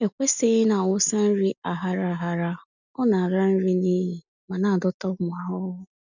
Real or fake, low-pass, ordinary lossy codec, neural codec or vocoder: real; 7.2 kHz; none; none